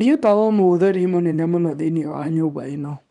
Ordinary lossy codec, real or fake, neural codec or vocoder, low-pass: none; fake; codec, 24 kHz, 0.9 kbps, WavTokenizer, small release; 10.8 kHz